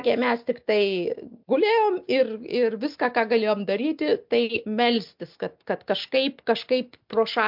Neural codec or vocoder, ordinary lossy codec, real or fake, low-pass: none; MP3, 48 kbps; real; 5.4 kHz